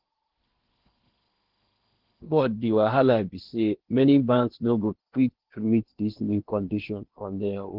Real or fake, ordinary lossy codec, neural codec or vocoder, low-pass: fake; Opus, 16 kbps; codec, 16 kHz in and 24 kHz out, 0.8 kbps, FocalCodec, streaming, 65536 codes; 5.4 kHz